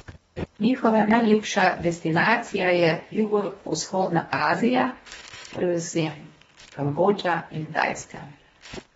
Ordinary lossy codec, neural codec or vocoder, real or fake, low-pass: AAC, 24 kbps; codec, 24 kHz, 1.5 kbps, HILCodec; fake; 10.8 kHz